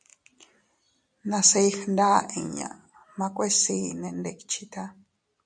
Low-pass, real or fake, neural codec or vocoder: 9.9 kHz; real; none